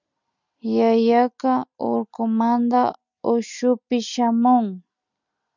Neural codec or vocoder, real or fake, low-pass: none; real; 7.2 kHz